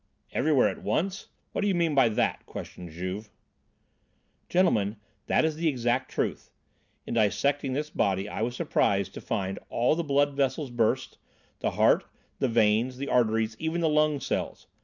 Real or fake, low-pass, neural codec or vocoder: real; 7.2 kHz; none